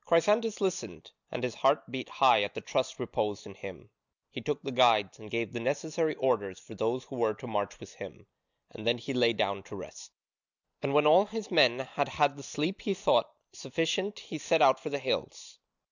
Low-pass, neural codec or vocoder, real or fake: 7.2 kHz; none; real